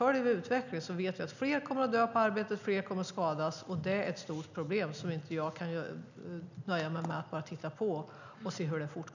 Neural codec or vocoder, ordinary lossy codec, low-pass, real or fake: none; none; 7.2 kHz; real